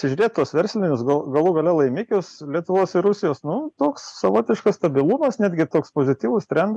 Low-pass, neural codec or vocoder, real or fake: 10.8 kHz; none; real